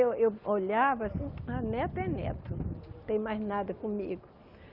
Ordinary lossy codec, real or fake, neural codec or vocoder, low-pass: Opus, 24 kbps; real; none; 5.4 kHz